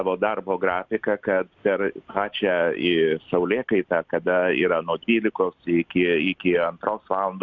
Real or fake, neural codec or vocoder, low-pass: real; none; 7.2 kHz